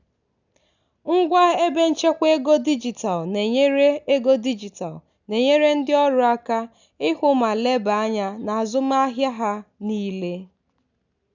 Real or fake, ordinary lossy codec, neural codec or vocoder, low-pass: real; none; none; 7.2 kHz